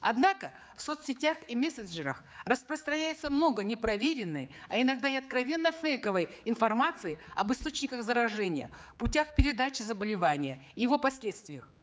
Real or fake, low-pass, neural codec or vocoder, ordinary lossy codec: fake; none; codec, 16 kHz, 4 kbps, X-Codec, HuBERT features, trained on general audio; none